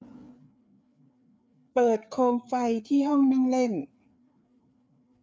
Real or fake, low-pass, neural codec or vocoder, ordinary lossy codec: fake; none; codec, 16 kHz, 4 kbps, FreqCodec, larger model; none